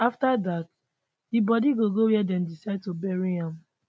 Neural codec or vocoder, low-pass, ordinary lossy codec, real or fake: none; none; none; real